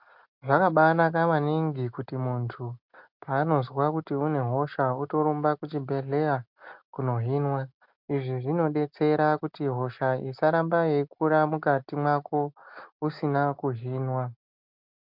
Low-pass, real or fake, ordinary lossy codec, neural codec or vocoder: 5.4 kHz; real; MP3, 48 kbps; none